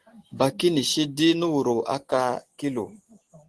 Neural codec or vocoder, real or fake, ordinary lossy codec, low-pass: none; real; Opus, 16 kbps; 10.8 kHz